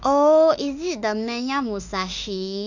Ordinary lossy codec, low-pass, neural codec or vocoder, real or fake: none; 7.2 kHz; autoencoder, 48 kHz, 32 numbers a frame, DAC-VAE, trained on Japanese speech; fake